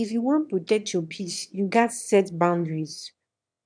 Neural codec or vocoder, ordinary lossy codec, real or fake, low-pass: autoencoder, 22.05 kHz, a latent of 192 numbers a frame, VITS, trained on one speaker; none; fake; 9.9 kHz